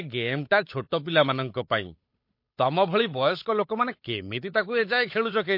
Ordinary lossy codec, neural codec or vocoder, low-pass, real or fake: MP3, 32 kbps; codec, 16 kHz, 8 kbps, FunCodec, trained on Chinese and English, 25 frames a second; 5.4 kHz; fake